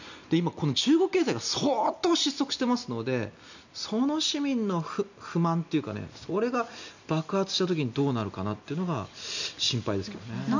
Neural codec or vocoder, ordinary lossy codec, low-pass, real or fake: none; none; 7.2 kHz; real